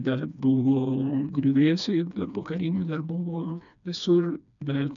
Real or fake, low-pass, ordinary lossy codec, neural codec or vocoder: fake; 7.2 kHz; MP3, 96 kbps; codec, 16 kHz, 2 kbps, FreqCodec, smaller model